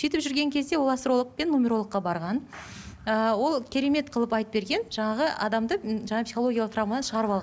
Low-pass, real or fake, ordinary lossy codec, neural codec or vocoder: none; real; none; none